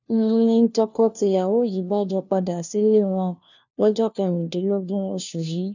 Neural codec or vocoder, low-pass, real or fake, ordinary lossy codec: codec, 16 kHz, 1 kbps, FunCodec, trained on LibriTTS, 50 frames a second; 7.2 kHz; fake; none